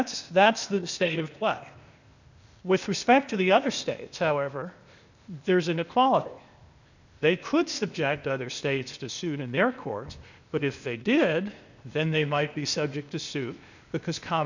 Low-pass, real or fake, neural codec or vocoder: 7.2 kHz; fake; codec, 16 kHz, 0.8 kbps, ZipCodec